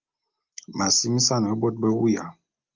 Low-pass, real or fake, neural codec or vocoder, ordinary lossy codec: 7.2 kHz; real; none; Opus, 32 kbps